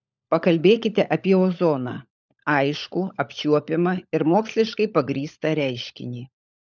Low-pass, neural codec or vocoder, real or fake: 7.2 kHz; codec, 16 kHz, 16 kbps, FunCodec, trained on LibriTTS, 50 frames a second; fake